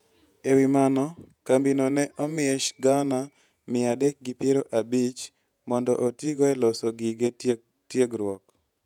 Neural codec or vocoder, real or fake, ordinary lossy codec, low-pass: vocoder, 48 kHz, 128 mel bands, Vocos; fake; none; 19.8 kHz